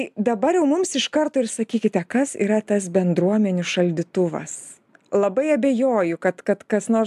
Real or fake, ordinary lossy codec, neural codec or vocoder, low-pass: real; AAC, 96 kbps; none; 14.4 kHz